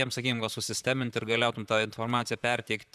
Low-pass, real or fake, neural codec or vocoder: 14.4 kHz; fake; vocoder, 44.1 kHz, 128 mel bands, Pupu-Vocoder